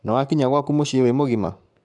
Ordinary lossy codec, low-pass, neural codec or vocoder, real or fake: none; 10.8 kHz; codec, 44.1 kHz, 7.8 kbps, Pupu-Codec; fake